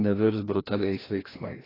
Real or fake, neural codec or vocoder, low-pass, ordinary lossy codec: fake; codec, 16 kHz, 1 kbps, FreqCodec, larger model; 5.4 kHz; AAC, 24 kbps